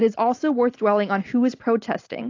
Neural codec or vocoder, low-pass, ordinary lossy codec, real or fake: none; 7.2 kHz; AAC, 48 kbps; real